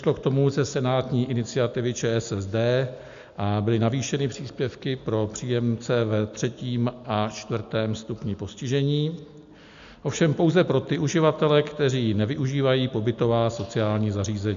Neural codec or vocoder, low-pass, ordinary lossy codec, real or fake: none; 7.2 kHz; MP3, 64 kbps; real